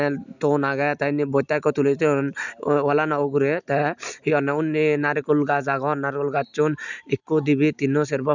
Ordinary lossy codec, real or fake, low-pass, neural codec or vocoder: none; real; 7.2 kHz; none